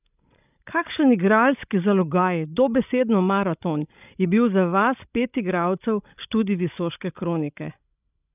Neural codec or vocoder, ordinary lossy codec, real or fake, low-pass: codec, 16 kHz, 16 kbps, FreqCodec, larger model; none; fake; 3.6 kHz